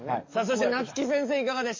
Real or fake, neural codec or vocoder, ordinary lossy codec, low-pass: fake; codec, 44.1 kHz, 7.8 kbps, DAC; MP3, 32 kbps; 7.2 kHz